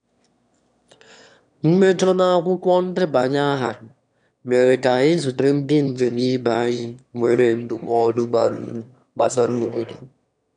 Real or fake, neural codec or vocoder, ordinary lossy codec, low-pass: fake; autoencoder, 22.05 kHz, a latent of 192 numbers a frame, VITS, trained on one speaker; none; 9.9 kHz